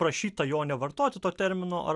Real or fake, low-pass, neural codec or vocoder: real; 10.8 kHz; none